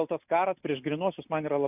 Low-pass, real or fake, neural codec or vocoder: 3.6 kHz; real; none